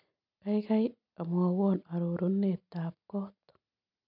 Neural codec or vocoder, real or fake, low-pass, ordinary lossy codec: none; real; 5.4 kHz; none